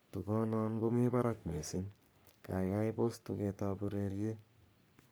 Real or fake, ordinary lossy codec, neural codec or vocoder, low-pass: fake; none; codec, 44.1 kHz, 3.4 kbps, Pupu-Codec; none